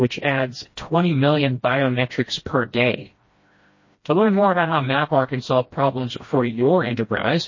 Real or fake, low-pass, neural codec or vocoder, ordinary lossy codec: fake; 7.2 kHz; codec, 16 kHz, 1 kbps, FreqCodec, smaller model; MP3, 32 kbps